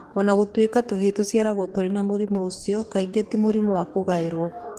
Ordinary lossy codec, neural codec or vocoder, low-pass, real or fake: Opus, 24 kbps; codec, 44.1 kHz, 2.6 kbps, DAC; 14.4 kHz; fake